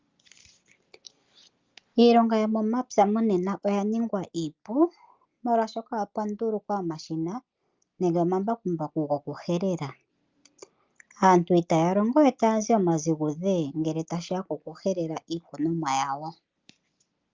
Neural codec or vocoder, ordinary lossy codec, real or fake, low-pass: none; Opus, 24 kbps; real; 7.2 kHz